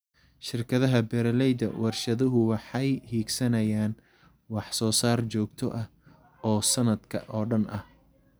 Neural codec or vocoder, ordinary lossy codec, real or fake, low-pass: none; none; real; none